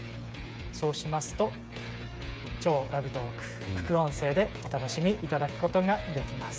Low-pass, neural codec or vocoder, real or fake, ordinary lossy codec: none; codec, 16 kHz, 8 kbps, FreqCodec, smaller model; fake; none